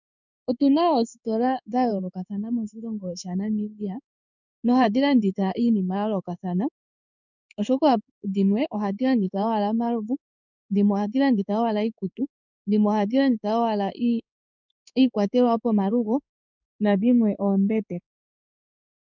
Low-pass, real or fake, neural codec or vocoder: 7.2 kHz; fake; codec, 16 kHz in and 24 kHz out, 1 kbps, XY-Tokenizer